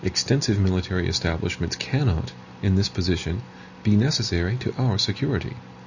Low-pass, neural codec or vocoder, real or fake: 7.2 kHz; none; real